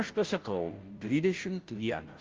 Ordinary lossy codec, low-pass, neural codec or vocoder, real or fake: Opus, 32 kbps; 7.2 kHz; codec, 16 kHz, 0.5 kbps, FunCodec, trained on Chinese and English, 25 frames a second; fake